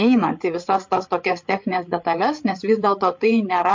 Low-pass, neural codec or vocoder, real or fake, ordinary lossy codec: 7.2 kHz; codec, 16 kHz, 8 kbps, FreqCodec, larger model; fake; MP3, 48 kbps